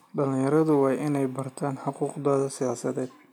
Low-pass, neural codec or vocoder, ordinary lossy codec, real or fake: 19.8 kHz; none; MP3, 96 kbps; real